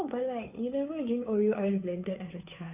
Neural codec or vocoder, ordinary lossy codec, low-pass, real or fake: codec, 16 kHz, 2 kbps, FunCodec, trained on Chinese and English, 25 frames a second; none; 3.6 kHz; fake